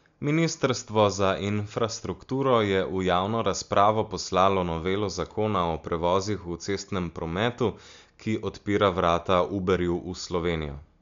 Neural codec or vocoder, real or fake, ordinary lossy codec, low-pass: none; real; MP3, 64 kbps; 7.2 kHz